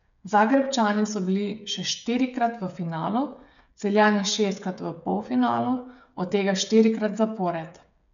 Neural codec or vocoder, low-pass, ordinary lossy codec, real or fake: codec, 16 kHz, 8 kbps, FreqCodec, smaller model; 7.2 kHz; none; fake